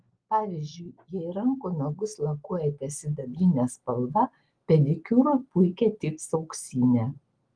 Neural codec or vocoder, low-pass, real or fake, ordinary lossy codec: none; 9.9 kHz; real; Opus, 24 kbps